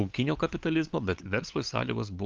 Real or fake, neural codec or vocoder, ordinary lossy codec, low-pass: fake; codec, 16 kHz, 8 kbps, FunCodec, trained on LibriTTS, 25 frames a second; Opus, 32 kbps; 7.2 kHz